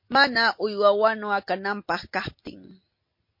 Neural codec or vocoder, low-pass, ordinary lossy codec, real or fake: none; 5.4 kHz; MP3, 32 kbps; real